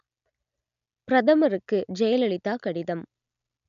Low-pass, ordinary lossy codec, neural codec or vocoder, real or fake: 7.2 kHz; none; none; real